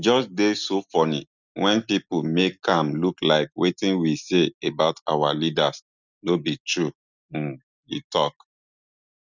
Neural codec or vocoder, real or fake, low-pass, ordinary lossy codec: none; real; 7.2 kHz; none